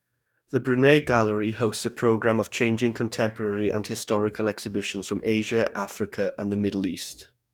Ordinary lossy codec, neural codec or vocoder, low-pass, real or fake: none; codec, 44.1 kHz, 2.6 kbps, DAC; 19.8 kHz; fake